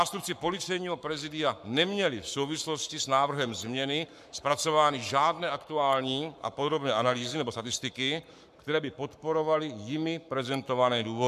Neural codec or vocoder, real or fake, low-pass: codec, 44.1 kHz, 7.8 kbps, Pupu-Codec; fake; 14.4 kHz